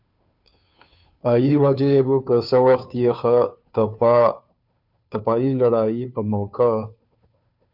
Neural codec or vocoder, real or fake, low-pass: codec, 16 kHz, 2 kbps, FunCodec, trained on Chinese and English, 25 frames a second; fake; 5.4 kHz